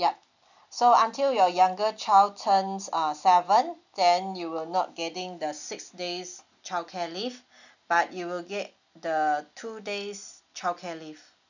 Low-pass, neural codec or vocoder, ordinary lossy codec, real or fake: 7.2 kHz; none; none; real